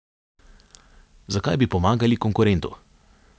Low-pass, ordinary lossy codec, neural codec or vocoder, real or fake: none; none; none; real